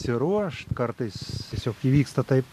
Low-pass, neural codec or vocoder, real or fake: 14.4 kHz; vocoder, 44.1 kHz, 128 mel bands every 512 samples, BigVGAN v2; fake